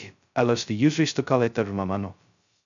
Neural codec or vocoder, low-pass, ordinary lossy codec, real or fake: codec, 16 kHz, 0.2 kbps, FocalCodec; 7.2 kHz; MP3, 96 kbps; fake